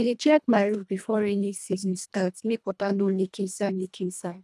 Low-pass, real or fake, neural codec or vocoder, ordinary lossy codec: none; fake; codec, 24 kHz, 1.5 kbps, HILCodec; none